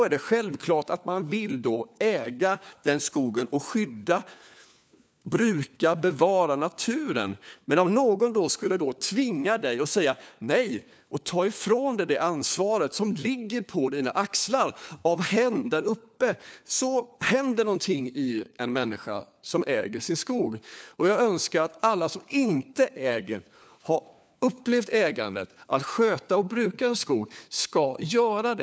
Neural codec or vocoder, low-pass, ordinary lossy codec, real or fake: codec, 16 kHz, 4 kbps, FunCodec, trained on LibriTTS, 50 frames a second; none; none; fake